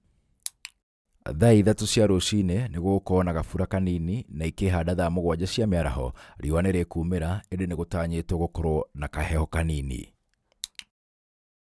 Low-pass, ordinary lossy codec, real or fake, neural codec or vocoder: none; none; real; none